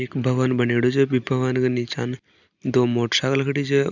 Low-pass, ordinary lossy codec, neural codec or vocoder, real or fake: 7.2 kHz; none; none; real